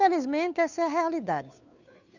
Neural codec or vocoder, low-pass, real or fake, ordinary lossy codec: codec, 16 kHz, 2 kbps, FunCodec, trained on Chinese and English, 25 frames a second; 7.2 kHz; fake; none